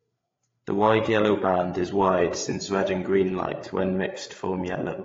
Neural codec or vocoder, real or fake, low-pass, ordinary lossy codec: codec, 16 kHz, 8 kbps, FreqCodec, larger model; fake; 7.2 kHz; AAC, 32 kbps